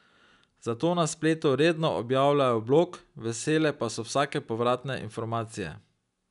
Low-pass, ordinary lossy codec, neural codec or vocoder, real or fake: 10.8 kHz; none; none; real